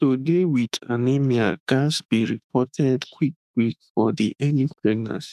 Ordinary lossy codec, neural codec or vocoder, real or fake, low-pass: none; autoencoder, 48 kHz, 32 numbers a frame, DAC-VAE, trained on Japanese speech; fake; 14.4 kHz